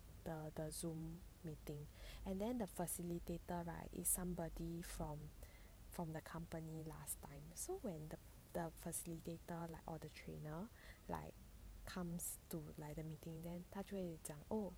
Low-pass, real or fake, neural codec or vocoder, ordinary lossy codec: none; fake; vocoder, 44.1 kHz, 128 mel bands every 512 samples, BigVGAN v2; none